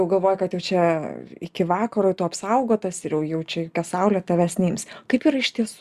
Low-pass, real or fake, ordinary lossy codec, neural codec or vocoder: 14.4 kHz; real; Opus, 64 kbps; none